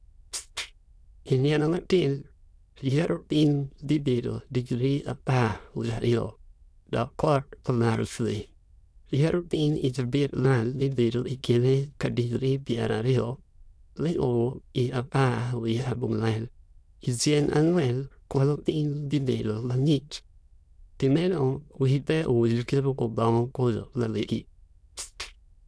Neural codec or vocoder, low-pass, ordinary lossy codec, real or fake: autoencoder, 22.05 kHz, a latent of 192 numbers a frame, VITS, trained on many speakers; none; none; fake